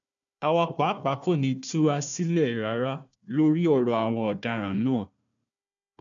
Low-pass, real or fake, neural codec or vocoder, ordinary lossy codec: 7.2 kHz; fake; codec, 16 kHz, 1 kbps, FunCodec, trained on Chinese and English, 50 frames a second; none